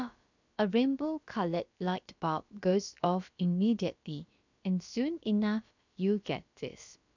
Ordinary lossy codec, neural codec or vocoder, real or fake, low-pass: none; codec, 16 kHz, about 1 kbps, DyCAST, with the encoder's durations; fake; 7.2 kHz